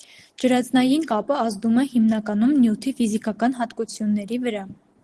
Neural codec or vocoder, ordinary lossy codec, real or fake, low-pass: none; Opus, 16 kbps; real; 10.8 kHz